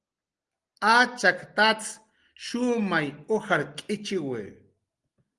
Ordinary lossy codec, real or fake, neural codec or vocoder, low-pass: Opus, 24 kbps; real; none; 10.8 kHz